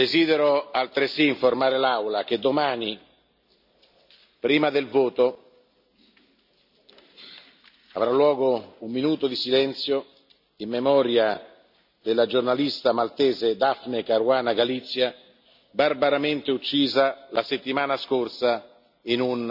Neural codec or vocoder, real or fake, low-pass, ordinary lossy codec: none; real; 5.4 kHz; none